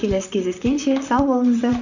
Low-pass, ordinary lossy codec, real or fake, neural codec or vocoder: 7.2 kHz; none; fake; vocoder, 44.1 kHz, 128 mel bands, Pupu-Vocoder